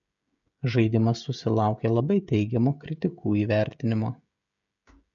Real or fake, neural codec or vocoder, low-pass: fake; codec, 16 kHz, 16 kbps, FreqCodec, smaller model; 7.2 kHz